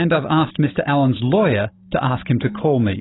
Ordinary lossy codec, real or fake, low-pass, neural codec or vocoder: AAC, 16 kbps; fake; 7.2 kHz; codec, 16 kHz, 16 kbps, FunCodec, trained on Chinese and English, 50 frames a second